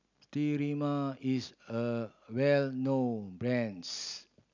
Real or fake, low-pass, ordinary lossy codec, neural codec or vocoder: real; 7.2 kHz; none; none